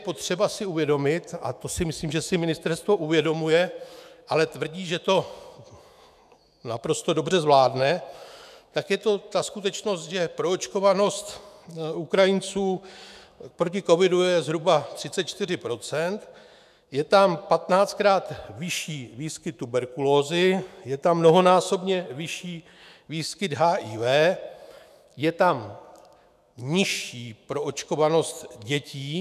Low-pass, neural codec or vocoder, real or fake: 14.4 kHz; autoencoder, 48 kHz, 128 numbers a frame, DAC-VAE, trained on Japanese speech; fake